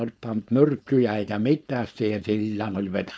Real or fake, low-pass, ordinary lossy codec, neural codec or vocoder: fake; none; none; codec, 16 kHz, 4.8 kbps, FACodec